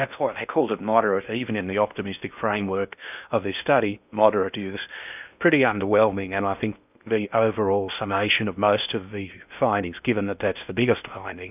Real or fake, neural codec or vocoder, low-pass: fake; codec, 16 kHz in and 24 kHz out, 0.6 kbps, FocalCodec, streaming, 4096 codes; 3.6 kHz